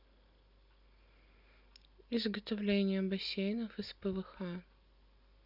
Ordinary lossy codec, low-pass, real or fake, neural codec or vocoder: Opus, 64 kbps; 5.4 kHz; real; none